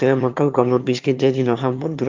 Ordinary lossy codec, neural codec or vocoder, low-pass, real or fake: Opus, 24 kbps; autoencoder, 22.05 kHz, a latent of 192 numbers a frame, VITS, trained on one speaker; 7.2 kHz; fake